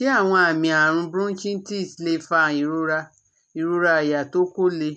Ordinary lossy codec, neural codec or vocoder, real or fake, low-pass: none; none; real; none